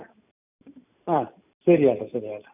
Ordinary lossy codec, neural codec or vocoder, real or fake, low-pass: none; none; real; 3.6 kHz